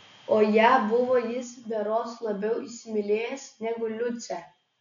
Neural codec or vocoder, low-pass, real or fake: none; 7.2 kHz; real